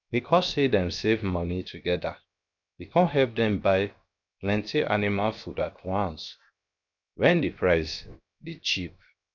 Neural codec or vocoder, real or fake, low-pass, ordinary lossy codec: codec, 16 kHz, 0.7 kbps, FocalCodec; fake; 7.2 kHz; none